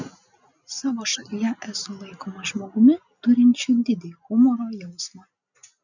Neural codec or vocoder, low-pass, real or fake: none; 7.2 kHz; real